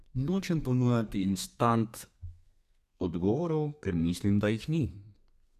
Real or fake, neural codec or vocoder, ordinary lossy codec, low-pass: fake; codec, 32 kHz, 1.9 kbps, SNAC; none; 14.4 kHz